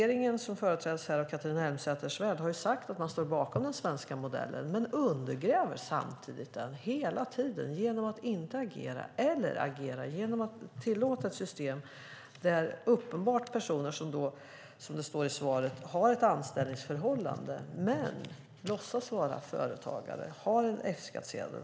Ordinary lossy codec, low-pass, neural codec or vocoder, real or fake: none; none; none; real